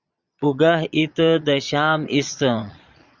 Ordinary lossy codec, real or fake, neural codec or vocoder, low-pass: Opus, 64 kbps; fake; vocoder, 22.05 kHz, 80 mel bands, Vocos; 7.2 kHz